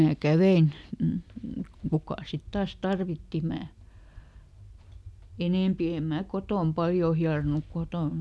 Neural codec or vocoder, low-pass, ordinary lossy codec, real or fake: none; none; none; real